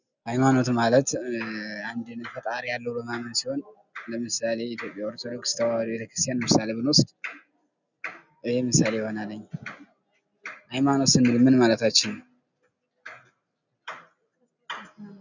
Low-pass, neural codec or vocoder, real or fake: 7.2 kHz; none; real